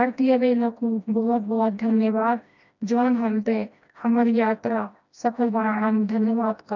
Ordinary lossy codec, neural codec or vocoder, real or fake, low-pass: none; codec, 16 kHz, 1 kbps, FreqCodec, smaller model; fake; 7.2 kHz